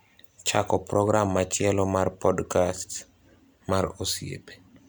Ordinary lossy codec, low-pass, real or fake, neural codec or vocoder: none; none; real; none